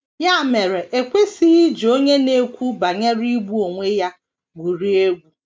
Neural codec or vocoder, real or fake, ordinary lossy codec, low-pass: none; real; none; none